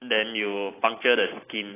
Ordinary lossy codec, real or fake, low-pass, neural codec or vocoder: AAC, 16 kbps; real; 3.6 kHz; none